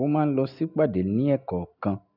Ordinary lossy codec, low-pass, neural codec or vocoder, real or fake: none; 5.4 kHz; none; real